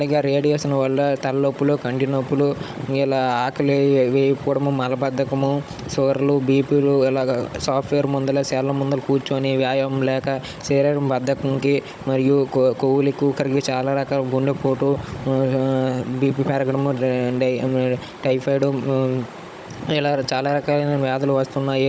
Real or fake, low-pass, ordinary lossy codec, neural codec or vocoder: fake; none; none; codec, 16 kHz, 16 kbps, FunCodec, trained on Chinese and English, 50 frames a second